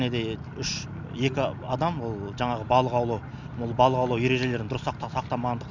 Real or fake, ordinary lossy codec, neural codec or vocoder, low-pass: real; none; none; 7.2 kHz